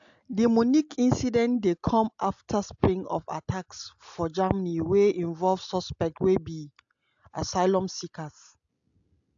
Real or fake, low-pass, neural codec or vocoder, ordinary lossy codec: real; 7.2 kHz; none; AAC, 64 kbps